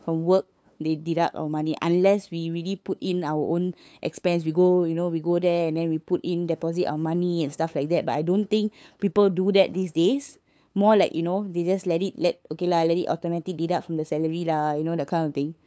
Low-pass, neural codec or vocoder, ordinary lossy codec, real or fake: none; codec, 16 kHz, 8 kbps, FunCodec, trained on LibriTTS, 25 frames a second; none; fake